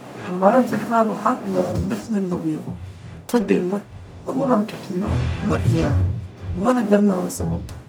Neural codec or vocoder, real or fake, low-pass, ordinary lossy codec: codec, 44.1 kHz, 0.9 kbps, DAC; fake; none; none